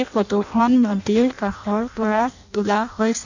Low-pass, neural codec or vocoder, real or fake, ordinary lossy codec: 7.2 kHz; codec, 16 kHz in and 24 kHz out, 0.6 kbps, FireRedTTS-2 codec; fake; none